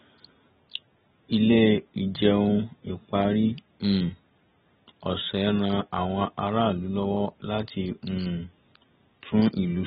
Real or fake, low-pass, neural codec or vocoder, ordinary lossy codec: real; 7.2 kHz; none; AAC, 16 kbps